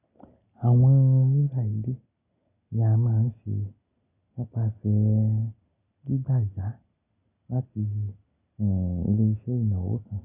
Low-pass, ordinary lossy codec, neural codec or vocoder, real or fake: 3.6 kHz; none; none; real